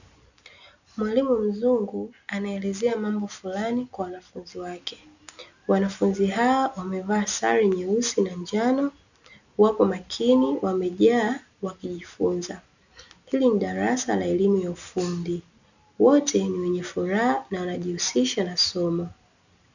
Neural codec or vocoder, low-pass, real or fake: none; 7.2 kHz; real